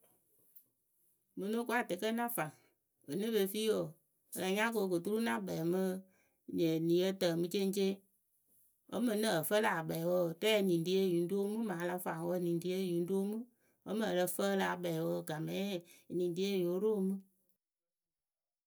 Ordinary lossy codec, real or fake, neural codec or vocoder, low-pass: none; real; none; none